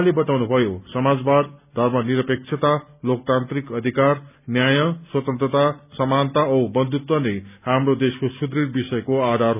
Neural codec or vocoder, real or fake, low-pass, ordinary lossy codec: none; real; 3.6 kHz; none